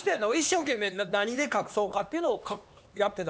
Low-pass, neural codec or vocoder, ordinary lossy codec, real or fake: none; codec, 16 kHz, 2 kbps, X-Codec, HuBERT features, trained on LibriSpeech; none; fake